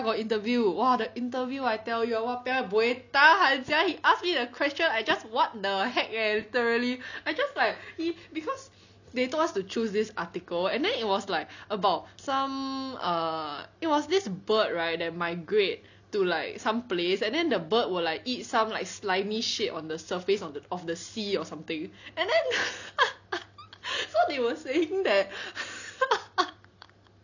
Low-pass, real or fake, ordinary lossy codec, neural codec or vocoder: 7.2 kHz; real; none; none